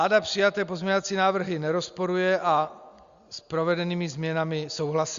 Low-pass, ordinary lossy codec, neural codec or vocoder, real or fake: 7.2 kHz; Opus, 64 kbps; none; real